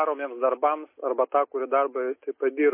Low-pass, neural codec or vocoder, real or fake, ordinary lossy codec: 3.6 kHz; none; real; MP3, 24 kbps